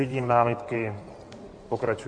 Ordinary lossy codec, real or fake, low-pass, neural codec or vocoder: MP3, 48 kbps; fake; 9.9 kHz; codec, 16 kHz in and 24 kHz out, 2.2 kbps, FireRedTTS-2 codec